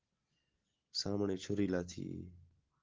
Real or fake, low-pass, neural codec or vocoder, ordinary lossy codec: real; 7.2 kHz; none; Opus, 16 kbps